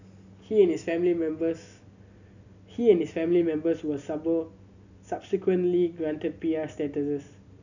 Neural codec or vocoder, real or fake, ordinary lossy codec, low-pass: none; real; none; 7.2 kHz